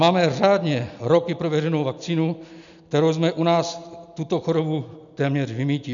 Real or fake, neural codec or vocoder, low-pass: real; none; 7.2 kHz